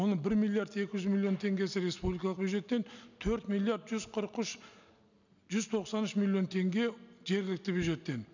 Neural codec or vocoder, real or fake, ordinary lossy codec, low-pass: none; real; none; 7.2 kHz